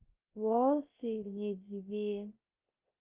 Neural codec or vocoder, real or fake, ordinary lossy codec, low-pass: codec, 16 kHz, 0.3 kbps, FocalCodec; fake; Opus, 24 kbps; 3.6 kHz